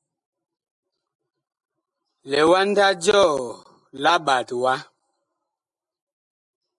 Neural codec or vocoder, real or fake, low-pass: none; real; 10.8 kHz